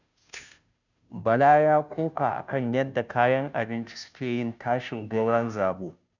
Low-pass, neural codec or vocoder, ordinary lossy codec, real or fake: 7.2 kHz; codec, 16 kHz, 0.5 kbps, FunCodec, trained on Chinese and English, 25 frames a second; none; fake